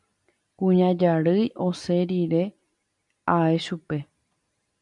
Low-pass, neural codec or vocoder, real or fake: 10.8 kHz; none; real